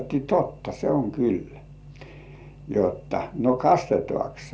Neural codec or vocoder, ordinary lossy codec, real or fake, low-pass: none; none; real; none